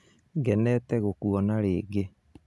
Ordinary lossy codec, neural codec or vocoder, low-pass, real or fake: none; none; none; real